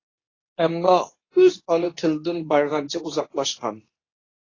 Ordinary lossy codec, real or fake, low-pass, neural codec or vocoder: AAC, 32 kbps; fake; 7.2 kHz; codec, 24 kHz, 0.9 kbps, WavTokenizer, medium speech release version 2